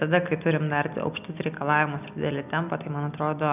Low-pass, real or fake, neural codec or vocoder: 3.6 kHz; real; none